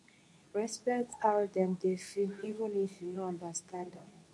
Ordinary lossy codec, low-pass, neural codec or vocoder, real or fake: none; none; codec, 24 kHz, 0.9 kbps, WavTokenizer, medium speech release version 2; fake